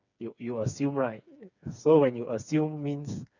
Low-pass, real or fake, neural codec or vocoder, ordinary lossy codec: 7.2 kHz; fake; codec, 16 kHz, 4 kbps, FreqCodec, smaller model; none